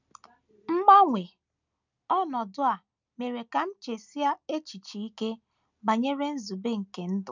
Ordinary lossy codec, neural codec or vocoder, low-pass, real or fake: none; none; 7.2 kHz; real